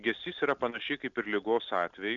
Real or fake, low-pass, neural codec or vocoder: real; 7.2 kHz; none